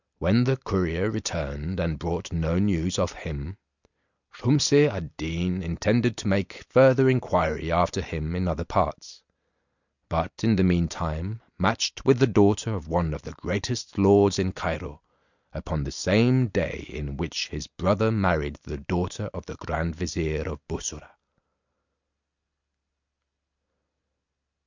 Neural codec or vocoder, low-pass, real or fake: none; 7.2 kHz; real